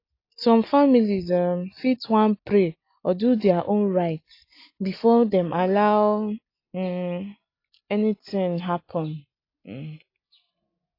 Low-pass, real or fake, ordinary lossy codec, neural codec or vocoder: 5.4 kHz; real; AAC, 32 kbps; none